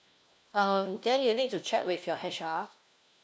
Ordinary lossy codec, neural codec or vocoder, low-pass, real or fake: none; codec, 16 kHz, 1 kbps, FunCodec, trained on LibriTTS, 50 frames a second; none; fake